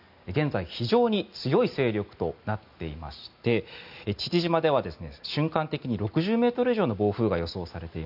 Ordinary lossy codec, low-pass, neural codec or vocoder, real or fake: none; 5.4 kHz; none; real